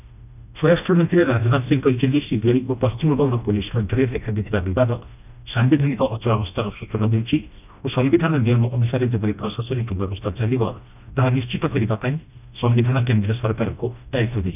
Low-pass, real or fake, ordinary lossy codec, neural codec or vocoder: 3.6 kHz; fake; none; codec, 16 kHz, 1 kbps, FreqCodec, smaller model